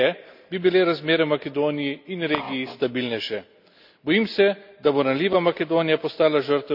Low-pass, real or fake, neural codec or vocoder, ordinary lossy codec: 5.4 kHz; real; none; none